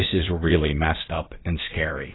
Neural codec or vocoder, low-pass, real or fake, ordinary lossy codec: codec, 16 kHz, about 1 kbps, DyCAST, with the encoder's durations; 7.2 kHz; fake; AAC, 16 kbps